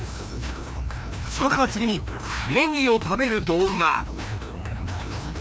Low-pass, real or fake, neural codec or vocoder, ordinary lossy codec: none; fake; codec, 16 kHz, 1 kbps, FreqCodec, larger model; none